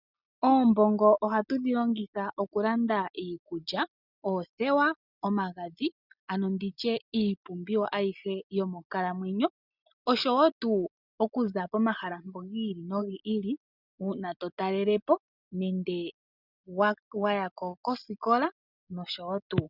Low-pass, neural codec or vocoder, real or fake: 5.4 kHz; none; real